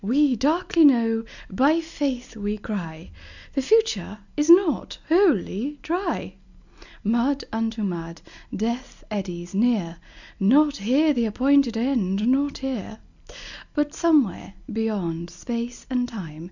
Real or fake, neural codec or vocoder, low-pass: real; none; 7.2 kHz